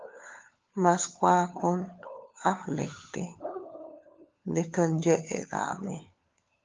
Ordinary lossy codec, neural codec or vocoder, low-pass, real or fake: Opus, 32 kbps; codec, 16 kHz, 4 kbps, FunCodec, trained on LibriTTS, 50 frames a second; 7.2 kHz; fake